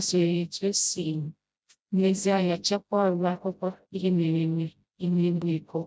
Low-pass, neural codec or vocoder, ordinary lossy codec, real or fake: none; codec, 16 kHz, 0.5 kbps, FreqCodec, smaller model; none; fake